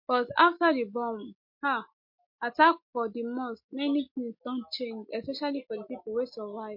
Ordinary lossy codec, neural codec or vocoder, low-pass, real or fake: MP3, 32 kbps; none; 5.4 kHz; real